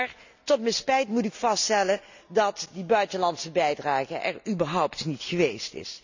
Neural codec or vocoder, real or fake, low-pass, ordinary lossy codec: none; real; 7.2 kHz; none